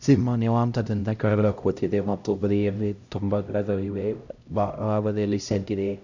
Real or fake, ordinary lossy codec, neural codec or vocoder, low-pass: fake; none; codec, 16 kHz, 0.5 kbps, X-Codec, HuBERT features, trained on LibriSpeech; 7.2 kHz